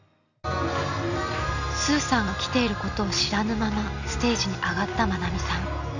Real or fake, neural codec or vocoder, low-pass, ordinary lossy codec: real; none; 7.2 kHz; none